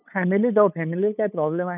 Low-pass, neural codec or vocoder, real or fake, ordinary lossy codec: 3.6 kHz; codec, 16 kHz, 8 kbps, FunCodec, trained on LibriTTS, 25 frames a second; fake; none